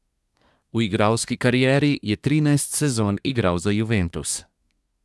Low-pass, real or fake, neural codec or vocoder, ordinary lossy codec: none; fake; codec, 24 kHz, 1 kbps, SNAC; none